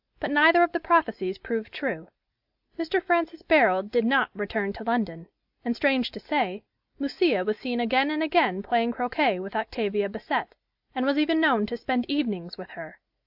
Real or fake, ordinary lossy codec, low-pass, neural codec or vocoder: real; AAC, 48 kbps; 5.4 kHz; none